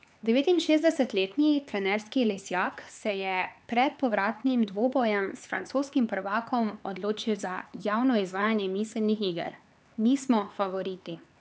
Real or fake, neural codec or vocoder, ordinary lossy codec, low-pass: fake; codec, 16 kHz, 4 kbps, X-Codec, HuBERT features, trained on LibriSpeech; none; none